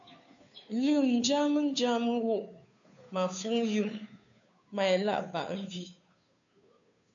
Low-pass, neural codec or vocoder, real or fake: 7.2 kHz; codec, 16 kHz, 2 kbps, FunCodec, trained on Chinese and English, 25 frames a second; fake